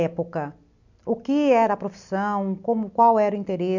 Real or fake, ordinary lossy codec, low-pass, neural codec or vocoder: real; none; 7.2 kHz; none